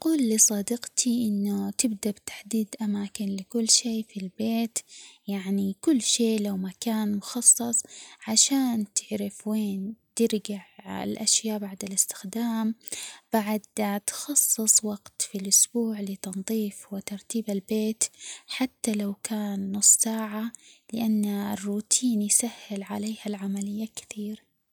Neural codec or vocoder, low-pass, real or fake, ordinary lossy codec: none; none; real; none